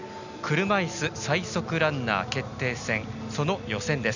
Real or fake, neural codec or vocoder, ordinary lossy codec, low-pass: real; none; none; 7.2 kHz